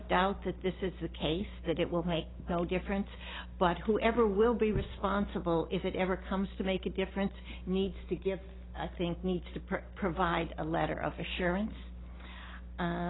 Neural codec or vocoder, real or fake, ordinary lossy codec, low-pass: none; real; AAC, 16 kbps; 7.2 kHz